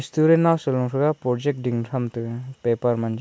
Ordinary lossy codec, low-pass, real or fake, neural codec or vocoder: none; none; real; none